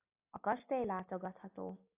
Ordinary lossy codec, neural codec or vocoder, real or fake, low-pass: AAC, 24 kbps; none; real; 3.6 kHz